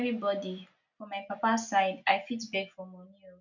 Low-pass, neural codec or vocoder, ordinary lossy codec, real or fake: 7.2 kHz; none; none; real